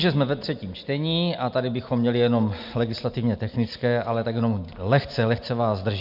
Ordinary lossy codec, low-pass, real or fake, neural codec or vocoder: MP3, 48 kbps; 5.4 kHz; real; none